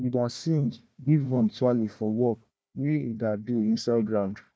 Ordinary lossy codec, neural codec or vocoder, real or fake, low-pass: none; codec, 16 kHz, 1 kbps, FunCodec, trained on Chinese and English, 50 frames a second; fake; none